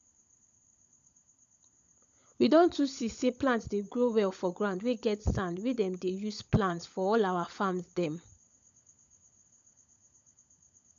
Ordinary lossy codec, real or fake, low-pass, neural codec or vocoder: none; fake; 7.2 kHz; codec, 16 kHz, 16 kbps, FunCodec, trained on LibriTTS, 50 frames a second